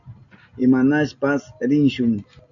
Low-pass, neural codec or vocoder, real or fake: 7.2 kHz; none; real